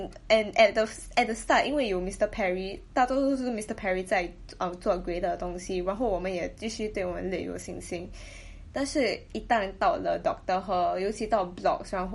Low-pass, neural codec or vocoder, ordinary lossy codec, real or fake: 19.8 kHz; none; MP3, 48 kbps; real